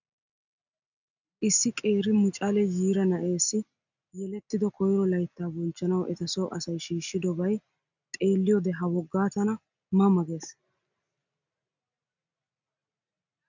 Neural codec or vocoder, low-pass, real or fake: none; 7.2 kHz; real